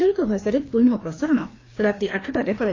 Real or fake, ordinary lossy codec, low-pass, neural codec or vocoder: fake; AAC, 32 kbps; 7.2 kHz; codec, 16 kHz, 2 kbps, FreqCodec, larger model